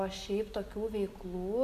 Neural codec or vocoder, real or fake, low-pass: none; real; 14.4 kHz